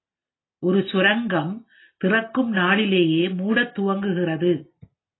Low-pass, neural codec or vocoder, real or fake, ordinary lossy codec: 7.2 kHz; none; real; AAC, 16 kbps